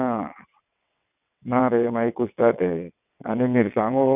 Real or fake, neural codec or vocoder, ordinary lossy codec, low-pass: fake; vocoder, 22.05 kHz, 80 mel bands, WaveNeXt; none; 3.6 kHz